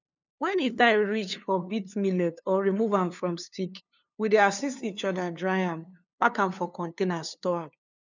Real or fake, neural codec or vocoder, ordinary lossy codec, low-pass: fake; codec, 16 kHz, 2 kbps, FunCodec, trained on LibriTTS, 25 frames a second; none; 7.2 kHz